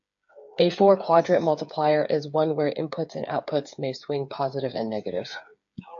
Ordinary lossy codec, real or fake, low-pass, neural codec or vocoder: AAC, 64 kbps; fake; 7.2 kHz; codec, 16 kHz, 8 kbps, FreqCodec, smaller model